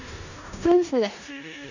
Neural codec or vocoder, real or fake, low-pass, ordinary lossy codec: codec, 16 kHz in and 24 kHz out, 0.4 kbps, LongCat-Audio-Codec, four codebook decoder; fake; 7.2 kHz; none